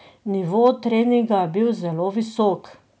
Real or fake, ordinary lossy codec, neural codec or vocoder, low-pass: real; none; none; none